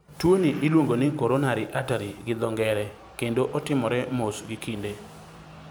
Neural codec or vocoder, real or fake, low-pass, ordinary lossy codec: vocoder, 44.1 kHz, 128 mel bands every 512 samples, BigVGAN v2; fake; none; none